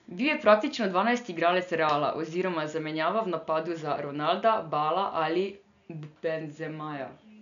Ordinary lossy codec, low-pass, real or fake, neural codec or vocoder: none; 7.2 kHz; real; none